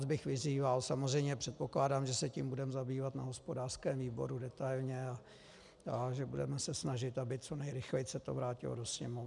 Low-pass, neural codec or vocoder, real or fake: 10.8 kHz; none; real